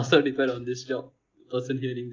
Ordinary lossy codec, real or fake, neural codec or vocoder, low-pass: Opus, 32 kbps; real; none; 7.2 kHz